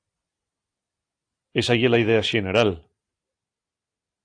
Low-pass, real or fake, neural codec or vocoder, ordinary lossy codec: 9.9 kHz; real; none; AAC, 48 kbps